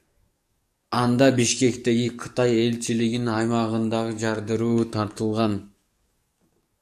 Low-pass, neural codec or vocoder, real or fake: 14.4 kHz; codec, 44.1 kHz, 7.8 kbps, DAC; fake